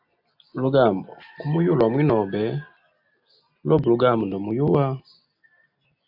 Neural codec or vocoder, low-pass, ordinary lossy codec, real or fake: none; 5.4 kHz; AAC, 48 kbps; real